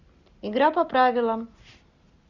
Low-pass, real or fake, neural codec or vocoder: 7.2 kHz; real; none